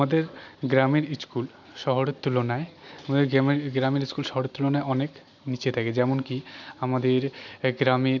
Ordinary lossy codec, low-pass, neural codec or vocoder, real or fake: none; 7.2 kHz; none; real